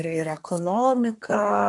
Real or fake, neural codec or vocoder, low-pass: fake; codec, 24 kHz, 1 kbps, SNAC; 10.8 kHz